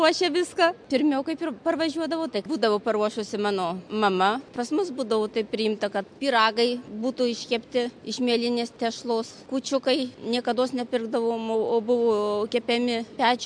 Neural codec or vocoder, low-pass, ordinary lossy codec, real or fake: none; 9.9 kHz; MP3, 64 kbps; real